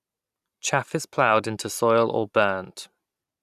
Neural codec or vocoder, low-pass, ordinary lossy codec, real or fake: vocoder, 44.1 kHz, 128 mel bands every 256 samples, BigVGAN v2; 14.4 kHz; none; fake